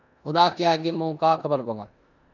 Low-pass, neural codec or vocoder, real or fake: 7.2 kHz; codec, 16 kHz in and 24 kHz out, 0.9 kbps, LongCat-Audio-Codec, four codebook decoder; fake